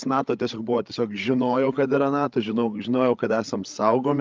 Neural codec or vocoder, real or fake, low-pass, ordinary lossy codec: codec, 16 kHz, 8 kbps, FreqCodec, larger model; fake; 7.2 kHz; Opus, 24 kbps